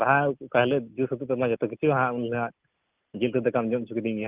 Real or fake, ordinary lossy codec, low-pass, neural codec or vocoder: real; Opus, 32 kbps; 3.6 kHz; none